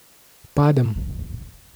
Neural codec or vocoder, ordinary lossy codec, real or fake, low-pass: vocoder, 44.1 kHz, 128 mel bands every 256 samples, BigVGAN v2; none; fake; none